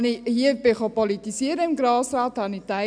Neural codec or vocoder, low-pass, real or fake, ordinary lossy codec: none; 9.9 kHz; real; none